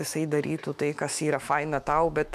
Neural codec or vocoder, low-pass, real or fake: autoencoder, 48 kHz, 128 numbers a frame, DAC-VAE, trained on Japanese speech; 14.4 kHz; fake